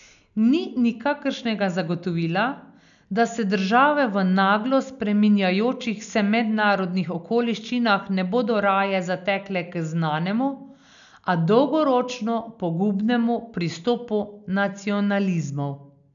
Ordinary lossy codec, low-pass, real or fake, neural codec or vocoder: none; 7.2 kHz; real; none